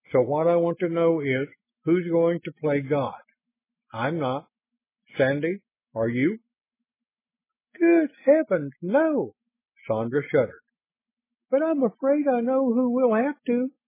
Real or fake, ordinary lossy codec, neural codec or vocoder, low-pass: real; MP3, 16 kbps; none; 3.6 kHz